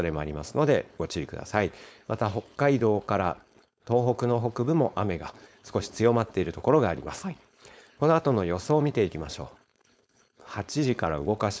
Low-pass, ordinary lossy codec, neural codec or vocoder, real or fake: none; none; codec, 16 kHz, 4.8 kbps, FACodec; fake